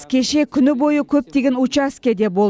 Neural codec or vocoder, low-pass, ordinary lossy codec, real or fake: none; none; none; real